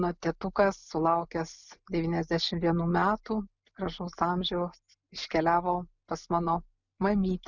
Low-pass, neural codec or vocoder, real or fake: 7.2 kHz; none; real